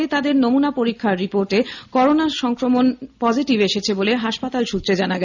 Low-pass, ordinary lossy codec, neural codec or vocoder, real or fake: 7.2 kHz; none; none; real